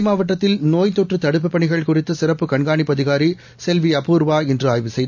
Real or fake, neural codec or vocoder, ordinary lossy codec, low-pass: real; none; none; 7.2 kHz